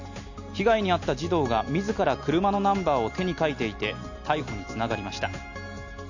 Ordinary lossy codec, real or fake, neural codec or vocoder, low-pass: none; real; none; 7.2 kHz